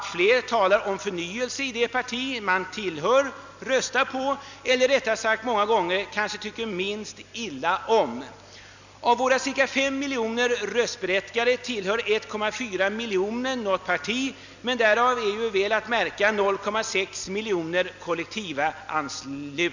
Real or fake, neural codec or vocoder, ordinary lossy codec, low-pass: real; none; none; 7.2 kHz